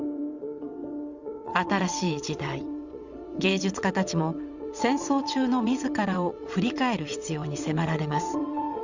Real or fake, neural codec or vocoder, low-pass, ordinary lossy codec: fake; vocoder, 22.05 kHz, 80 mel bands, WaveNeXt; 7.2 kHz; Opus, 64 kbps